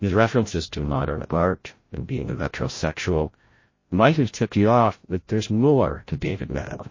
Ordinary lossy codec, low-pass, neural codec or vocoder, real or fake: MP3, 32 kbps; 7.2 kHz; codec, 16 kHz, 0.5 kbps, FreqCodec, larger model; fake